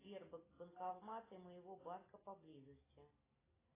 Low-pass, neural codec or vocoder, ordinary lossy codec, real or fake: 3.6 kHz; none; AAC, 16 kbps; real